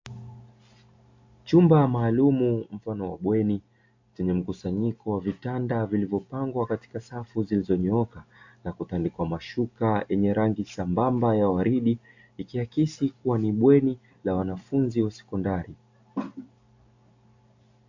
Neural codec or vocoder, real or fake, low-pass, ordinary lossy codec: none; real; 7.2 kHz; AAC, 48 kbps